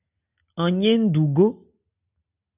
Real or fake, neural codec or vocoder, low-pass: real; none; 3.6 kHz